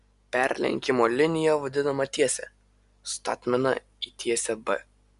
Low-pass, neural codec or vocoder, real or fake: 10.8 kHz; none; real